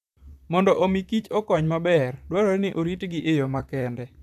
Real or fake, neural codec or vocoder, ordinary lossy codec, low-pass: fake; vocoder, 44.1 kHz, 128 mel bands, Pupu-Vocoder; none; 14.4 kHz